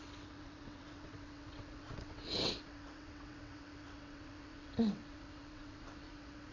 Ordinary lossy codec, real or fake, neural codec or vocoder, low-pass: none; fake; vocoder, 22.05 kHz, 80 mel bands, WaveNeXt; 7.2 kHz